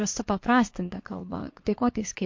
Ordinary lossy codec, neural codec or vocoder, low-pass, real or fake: MP3, 48 kbps; codec, 24 kHz, 1 kbps, SNAC; 7.2 kHz; fake